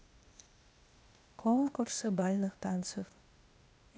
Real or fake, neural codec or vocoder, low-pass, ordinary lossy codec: fake; codec, 16 kHz, 0.8 kbps, ZipCodec; none; none